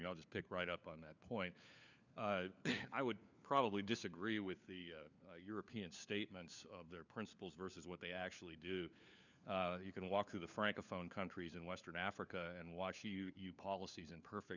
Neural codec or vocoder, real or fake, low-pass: codec, 16 kHz, 4 kbps, FunCodec, trained on LibriTTS, 50 frames a second; fake; 7.2 kHz